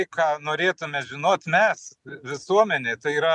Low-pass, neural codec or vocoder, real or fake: 10.8 kHz; none; real